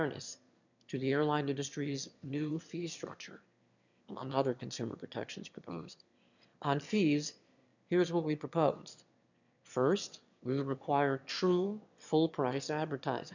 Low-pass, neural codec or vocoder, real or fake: 7.2 kHz; autoencoder, 22.05 kHz, a latent of 192 numbers a frame, VITS, trained on one speaker; fake